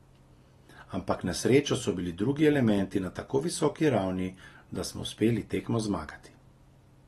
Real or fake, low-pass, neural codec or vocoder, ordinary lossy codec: real; 19.8 kHz; none; AAC, 32 kbps